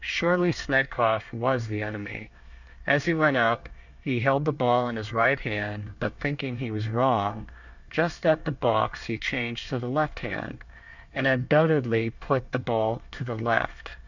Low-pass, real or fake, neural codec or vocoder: 7.2 kHz; fake; codec, 24 kHz, 1 kbps, SNAC